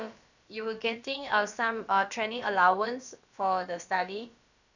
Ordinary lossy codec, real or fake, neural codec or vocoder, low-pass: none; fake; codec, 16 kHz, about 1 kbps, DyCAST, with the encoder's durations; 7.2 kHz